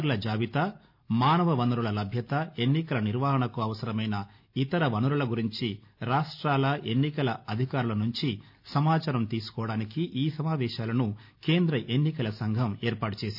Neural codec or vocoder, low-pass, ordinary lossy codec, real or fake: none; 5.4 kHz; none; real